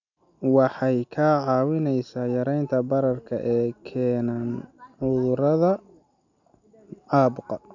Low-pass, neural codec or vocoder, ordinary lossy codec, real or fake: 7.2 kHz; none; none; real